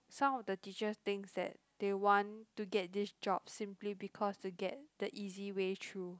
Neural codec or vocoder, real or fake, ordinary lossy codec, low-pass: none; real; none; none